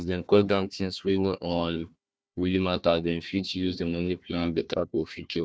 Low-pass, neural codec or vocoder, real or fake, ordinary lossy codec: none; codec, 16 kHz, 1 kbps, FunCodec, trained on Chinese and English, 50 frames a second; fake; none